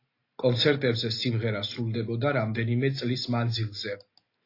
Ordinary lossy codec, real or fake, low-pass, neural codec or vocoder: AAC, 32 kbps; real; 5.4 kHz; none